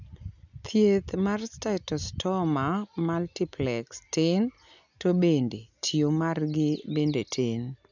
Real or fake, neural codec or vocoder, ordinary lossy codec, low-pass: real; none; none; 7.2 kHz